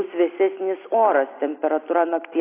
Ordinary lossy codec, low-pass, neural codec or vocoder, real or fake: AAC, 24 kbps; 3.6 kHz; none; real